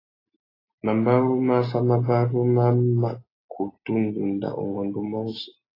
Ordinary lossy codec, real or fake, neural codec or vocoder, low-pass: AAC, 24 kbps; real; none; 5.4 kHz